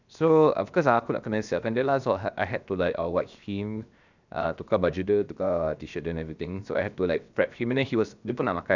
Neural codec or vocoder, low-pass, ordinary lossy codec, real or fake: codec, 16 kHz, 0.7 kbps, FocalCodec; 7.2 kHz; none; fake